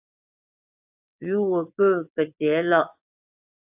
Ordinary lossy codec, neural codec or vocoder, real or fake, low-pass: AAC, 32 kbps; vocoder, 22.05 kHz, 80 mel bands, WaveNeXt; fake; 3.6 kHz